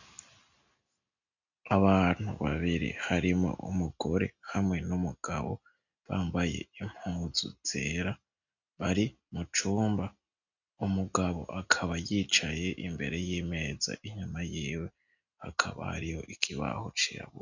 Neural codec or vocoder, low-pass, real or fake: none; 7.2 kHz; real